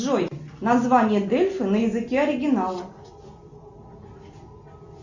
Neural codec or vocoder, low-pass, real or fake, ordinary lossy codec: none; 7.2 kHz; real; Opus, 64 kbps